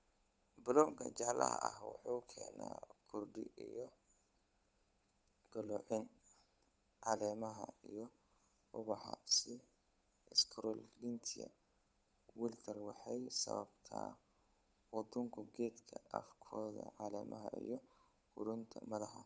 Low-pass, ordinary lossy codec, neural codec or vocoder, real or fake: none; none; codec, 16 kHz, 8 kbps, FunCodec, trained on Chinese and English, 25 frames a second; fake